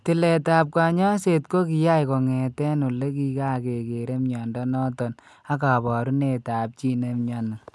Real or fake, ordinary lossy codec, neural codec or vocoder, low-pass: real; none; none; none